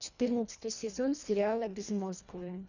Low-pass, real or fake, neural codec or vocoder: 7.2 kHz; fake; codec, 24 kHz, 1.5 kbps, HILCodec